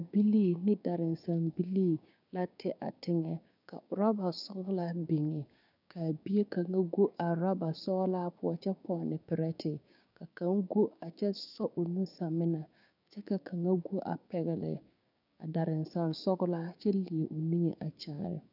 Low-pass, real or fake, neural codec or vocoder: 5.4 kHz; fake; codec, 16 kHz, 6 kbps, DAC